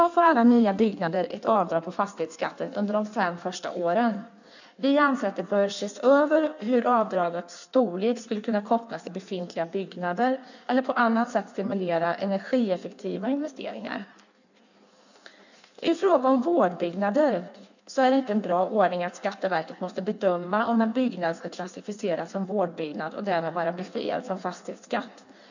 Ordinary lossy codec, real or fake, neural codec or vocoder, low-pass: none; fake; codec, 16 kHz in and 24 kHz out, 1.1 kbps, FireRedTTS-2 codec; 7.2 kHz